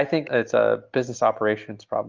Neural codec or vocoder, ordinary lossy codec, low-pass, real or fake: none; Opus, 32 kbps; 7.2 kHz; real